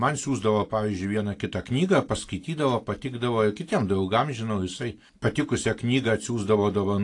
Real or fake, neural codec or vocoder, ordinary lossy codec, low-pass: real; none; AAC, 48 kbps; 10.8 kHz